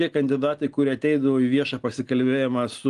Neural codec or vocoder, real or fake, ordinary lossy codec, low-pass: none; real; Opus, 24 kbps; 10.8 kHz